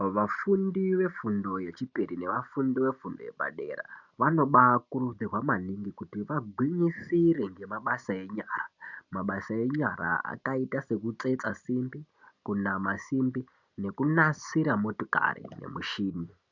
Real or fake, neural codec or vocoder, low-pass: real; none; 7.2 kHz